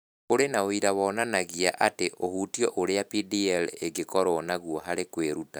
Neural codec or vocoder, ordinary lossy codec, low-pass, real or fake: vocoder, 44.1 kHz, 128 mel bands every 256 samples, BigVGAN v2; none; none; fake